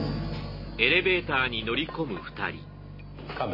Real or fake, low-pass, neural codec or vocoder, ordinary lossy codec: real; 5.4 kHz; none; MP3, 24 kbps